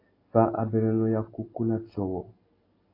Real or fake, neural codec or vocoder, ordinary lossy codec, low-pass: real; none; AAC, 24 kbps; 5.4 kHz